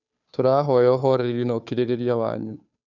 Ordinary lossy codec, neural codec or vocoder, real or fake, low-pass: none; codec, 16 kHz, 2 kbps, FunCodec, trained on Chinese and English, 25 frames a second; fake; 7.2 kHz